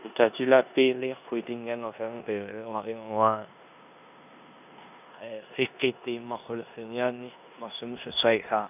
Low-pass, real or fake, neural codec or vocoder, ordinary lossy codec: 3.6 kHz; fake; codec, 16 kHz in and 24 kHz out, 0.9 kbps, LongCat-Audio-Codec, four codebook decoder; none